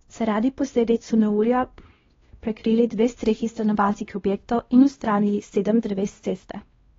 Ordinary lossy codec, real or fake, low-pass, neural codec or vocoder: AAC, 24 kbps; fake; 7.2 kHz; codec, 16 kHz, 1 kbps, X-Codec, WavLM features, trained on Multilingual LibriSpeech